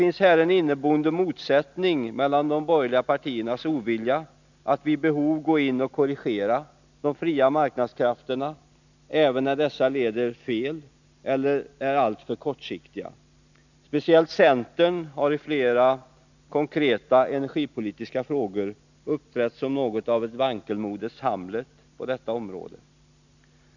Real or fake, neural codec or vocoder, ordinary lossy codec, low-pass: real; none; none; 7.2 kHz